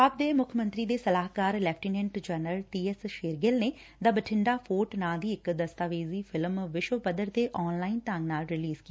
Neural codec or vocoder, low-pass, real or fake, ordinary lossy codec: none; none; real; none